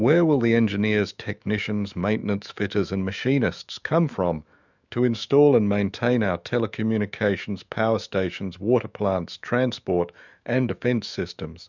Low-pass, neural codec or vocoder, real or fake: 7.2 kHz; autoencoder, 48 kHz, 128 numbers a frame, DAC-VAE, trained on Japanese speech; fake